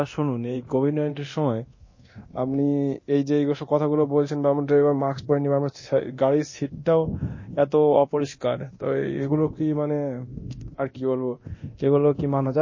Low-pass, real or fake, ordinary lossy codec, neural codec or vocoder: 7.2 kHz; fake; MP3, 32 kbps; codec, 24 kHz, 0.9 kbps, DualCodec